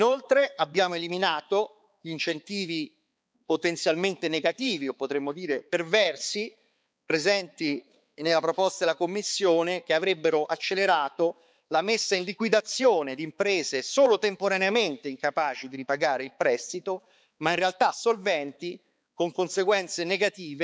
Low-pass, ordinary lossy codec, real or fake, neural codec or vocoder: none; none; fake; codec, 16 kHz, 4 kbps, X-Codec, HuBERT features, trained on balanced general audio